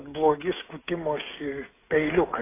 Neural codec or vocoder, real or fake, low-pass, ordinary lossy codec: codec, 16 kHz, 16 kbps, FreqCodec, smaller model; fake; 3.6 kHz; AAC, 16 kbps